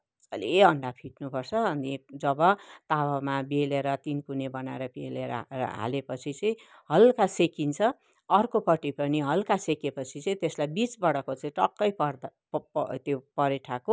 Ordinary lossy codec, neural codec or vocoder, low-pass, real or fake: none; none; none; real